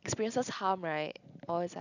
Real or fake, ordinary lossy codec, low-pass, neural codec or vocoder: real; none; 7.2 kHz; none